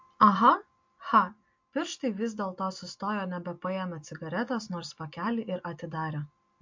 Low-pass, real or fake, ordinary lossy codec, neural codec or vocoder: 7.2 kHz; real; MP3, 48 kbps; none